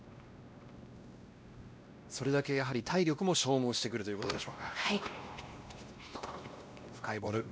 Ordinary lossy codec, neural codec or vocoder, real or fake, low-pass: none; codec, 16 kHz, 1 kbps, X-Codec, WavLM features, trained on Multilingual LibriSpeech; fake; none